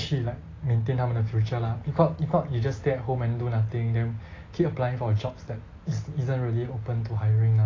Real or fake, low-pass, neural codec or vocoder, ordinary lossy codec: real; 7.2 kHz; none; AAC, 32 kbps